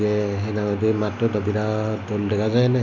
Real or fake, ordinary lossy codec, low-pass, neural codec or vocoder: real; none; 7.2 kHz; none